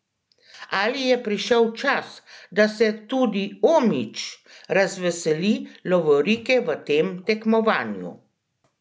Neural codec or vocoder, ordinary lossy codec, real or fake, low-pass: none; none; real; none